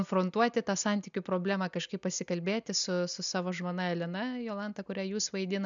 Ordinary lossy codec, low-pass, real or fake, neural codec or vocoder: MP3, 96 kbps; 7.2 kHz; real; none